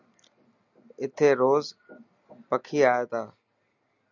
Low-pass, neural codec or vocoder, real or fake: 7.2 kHz; none; real